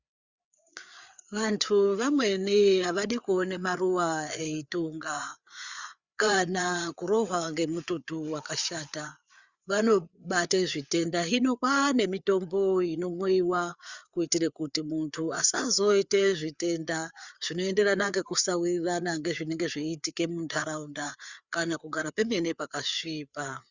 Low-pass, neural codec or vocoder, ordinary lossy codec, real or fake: 7.2 kHz; codec, 16 kHz, 4 kbps, FreqCodec, larger model; Opus, 64 kbps; fake